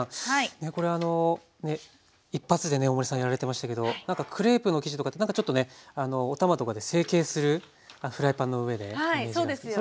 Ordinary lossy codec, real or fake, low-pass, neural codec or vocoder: none; real; none; none